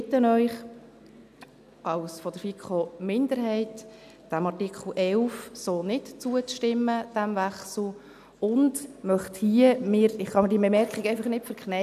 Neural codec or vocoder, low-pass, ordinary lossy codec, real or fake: none; 14.4 kHz; none; real